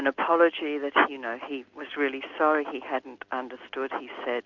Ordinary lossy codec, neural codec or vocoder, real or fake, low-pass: Opus, 64 kbps; none; real; 7.2 kHz